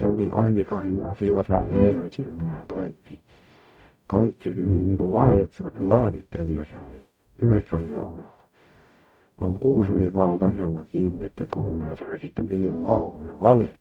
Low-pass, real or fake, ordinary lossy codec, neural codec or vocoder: 19.8 kHz; fake; none; codec, 44.1 kHz, 0.9 kbps, DAC